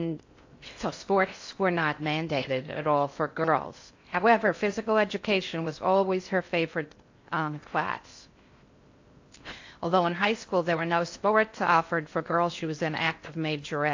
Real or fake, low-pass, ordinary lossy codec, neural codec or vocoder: fake; 7.2 kHz; AAC, 48 kbps; codec, 16 kHz in and 24 kHz out, 0.6 kbps, FocalCodec, streaming, 4096 codes